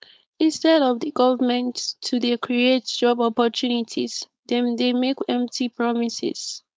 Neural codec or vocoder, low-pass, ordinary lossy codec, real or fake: codec, 16 kHz, 4.8 kbps, FACodec; none; none; fake